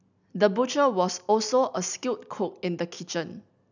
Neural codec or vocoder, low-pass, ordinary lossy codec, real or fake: none; 7.2 kHz; none; real